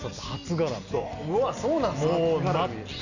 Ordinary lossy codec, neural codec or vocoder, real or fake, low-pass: none; none; real; 7.2 kHz